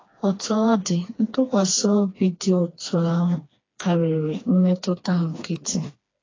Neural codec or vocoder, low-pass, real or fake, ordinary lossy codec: codec, 16 kHz, 2 kbps, FreqCodec, smaller model; 7.2 kHz; fake; AAC, 32 kbps